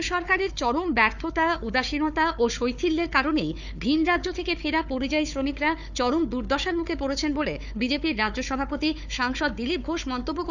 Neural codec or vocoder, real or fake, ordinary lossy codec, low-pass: codec, 16 kHz, 4 kbps, FunCodec, trained on Chinese and English, 50 frames a second; fake; none; 7.2 kHz